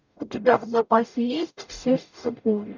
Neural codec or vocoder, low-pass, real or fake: codec, 44.1 kHz, 0.9 kbps, DAC; 7.2 kHz; fake